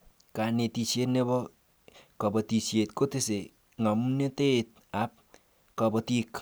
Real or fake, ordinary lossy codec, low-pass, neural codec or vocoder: real; none; none; none